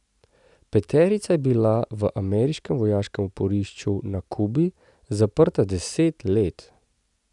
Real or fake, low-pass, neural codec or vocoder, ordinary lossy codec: real; 10.8 kHz; none; none